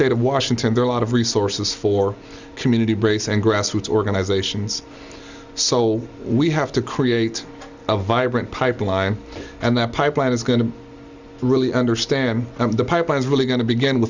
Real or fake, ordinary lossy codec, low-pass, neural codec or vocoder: real; Opus, 64 kbps; 7.2 kHz; none